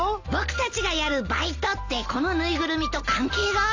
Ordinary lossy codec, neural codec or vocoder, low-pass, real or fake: AAC, 48 kbps; none; 7.2 kHz; real